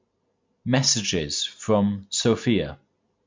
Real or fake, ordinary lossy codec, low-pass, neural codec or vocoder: real; MP3, 64 kbps; 7.2 kHz; none